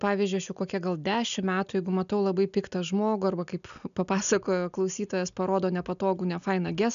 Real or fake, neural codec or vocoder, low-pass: real; none; 7.2 kHz